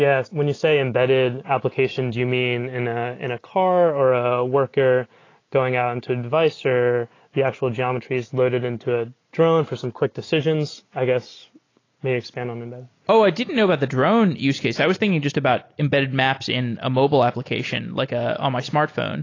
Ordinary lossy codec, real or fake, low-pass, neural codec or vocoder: AAC, 32 kbps; real; 7.2 kHz; none